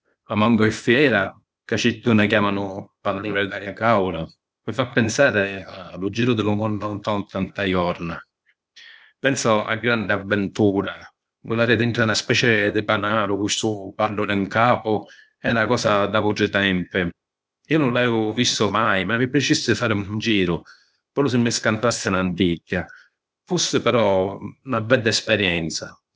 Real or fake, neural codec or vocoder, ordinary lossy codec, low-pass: fake; codec, 16 kHz, 0.8 kbps, ZipCodec; none; none